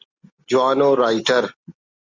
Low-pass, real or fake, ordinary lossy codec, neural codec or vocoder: 7.2 kHz; real; Opus, 64 kbps; none